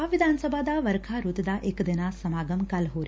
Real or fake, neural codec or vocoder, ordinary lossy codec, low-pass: real; none; none; none